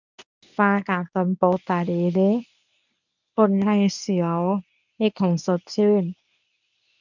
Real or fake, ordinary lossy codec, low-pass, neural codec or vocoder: fake; none; 7.2 kHz; codec, 24 kHz, 0.9 kbps, WavTokenizer, medium speech release version 1